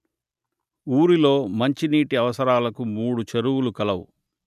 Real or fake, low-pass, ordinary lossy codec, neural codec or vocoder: real; 14.4 kHz; none; none